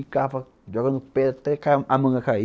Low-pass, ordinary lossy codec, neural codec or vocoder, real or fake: none; none; none; real